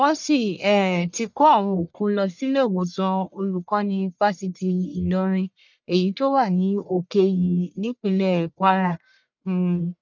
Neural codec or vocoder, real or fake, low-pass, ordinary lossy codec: codec, 44.1 kHz, 1.7 kbps, Pupu-Codec; fake; 7.2 kHz; none